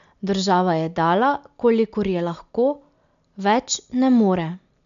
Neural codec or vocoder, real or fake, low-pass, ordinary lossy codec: none; real; 7.2 kHz; none